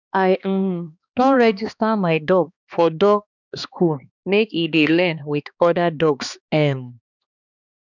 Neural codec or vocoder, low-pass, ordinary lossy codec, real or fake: codec, 16 kHz, 2 kbps, X-Codec, HuBERT features, trained on balanced general audio; 7.2 kHz; none; fake